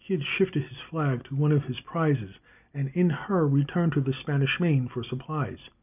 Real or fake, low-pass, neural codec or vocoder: real; 3.6 kHz; none